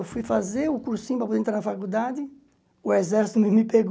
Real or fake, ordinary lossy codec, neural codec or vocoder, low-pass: real; none; none; none